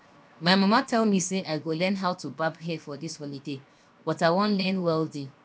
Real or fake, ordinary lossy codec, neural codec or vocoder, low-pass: fake; none; codec, 16 kHz, 0.7 kbps, FocalCodec; none